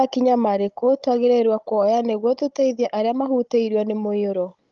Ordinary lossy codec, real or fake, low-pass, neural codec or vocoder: Opus, 32 kbps; fake; 7.2 kHz; codec, 16 kHz, 16 kbps, FunCodec, trained on Chinese and English, 50 frames a second